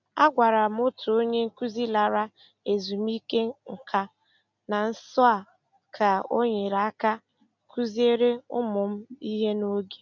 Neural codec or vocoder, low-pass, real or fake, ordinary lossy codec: none; 7.2 kHz; real; none